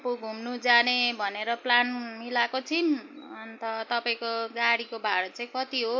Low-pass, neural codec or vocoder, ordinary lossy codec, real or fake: 7.2 kHz; none; MP3, 48 kbps; real